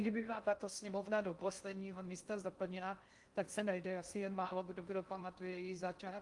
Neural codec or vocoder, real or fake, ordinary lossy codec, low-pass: codec, 16 kHz in and 24 kHz out, 0.6 kbps, FocalCodec, streaming, 4096 codes; fake; Opus, 32 kbps; 10.8 kHz